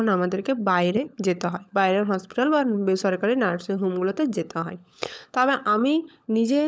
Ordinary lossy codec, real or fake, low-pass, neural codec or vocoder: none; fake; none; codec, 16 kHz, 16 kbps, FunCodec, trained on LibriTTS, 50 frames a second